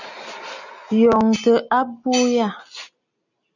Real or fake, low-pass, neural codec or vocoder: real; 7.2 kHz; none